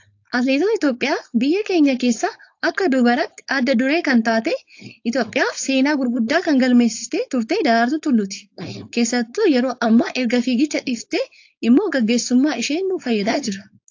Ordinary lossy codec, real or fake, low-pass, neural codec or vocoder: AAC, 48 kbps; fake; 7.2 kHz; codec, 16 kHz, 4.8 kbps, FACodec